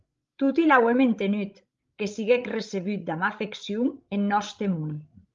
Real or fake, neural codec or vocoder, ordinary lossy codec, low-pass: fake; codec, 16 kHz, 16 kbps, FreqCodec, larger model; Opus, 32 kbps; 7.2 kHz